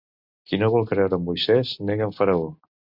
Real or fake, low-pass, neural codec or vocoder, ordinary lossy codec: real; 5.4 kHz; none; MP3, 48 kbps